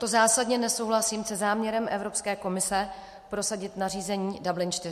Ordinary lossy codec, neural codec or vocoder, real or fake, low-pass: MP3, 64 kbps; none; real; 14.4 kHz